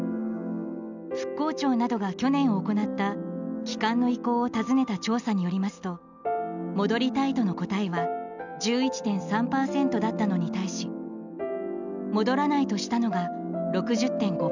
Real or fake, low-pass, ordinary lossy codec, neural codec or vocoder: real; 7.2 kHz; none; none